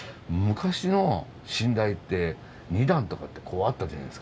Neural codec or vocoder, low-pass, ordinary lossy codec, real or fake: none; none; none; real